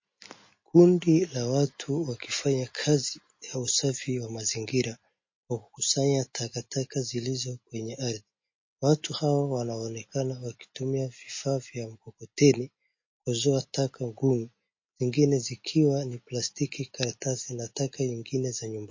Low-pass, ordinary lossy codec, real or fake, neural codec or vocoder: 7.2 kHz; MP3, 32 kbps; real; none